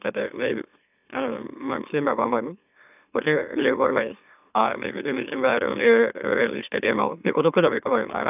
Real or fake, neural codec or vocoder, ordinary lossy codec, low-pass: fake; autoencoder, 44.1 kHz, a latent of 192 numbers a frame, MeloTTS; none; 3.6 kHz